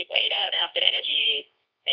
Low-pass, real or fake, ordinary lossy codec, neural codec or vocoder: 7.2 kHz; fake; none; codec, 24 kHz, 0.9 kbps, WavTokenizer, medium music audio release